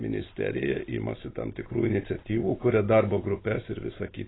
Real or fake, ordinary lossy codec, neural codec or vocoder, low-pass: real; AAC, 16 kbps; none; 7.2 kHz